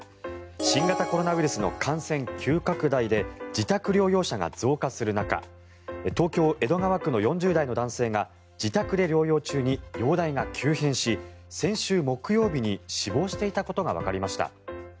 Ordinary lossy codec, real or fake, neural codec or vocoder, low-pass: none; real; none; none